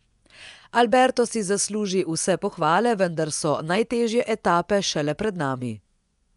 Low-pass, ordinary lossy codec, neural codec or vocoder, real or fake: 10.8 kHz; none; vocoder, 24 kHz, 100 mel bands, Vocos; fake